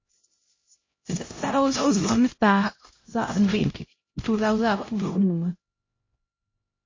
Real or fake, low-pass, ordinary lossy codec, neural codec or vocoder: fake; 7.2 kHz; MP3, 32 kbps; codec, 16 kHz, 0.5 kbps, X-Codec, HuBERT features, trained on LibriSpeech